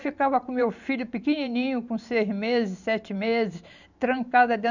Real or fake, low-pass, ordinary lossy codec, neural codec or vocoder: fake; 7.2 kHz; none; vocoder, 44.1 kHz, 128 mel bands every 512 samples, BigVGAN v2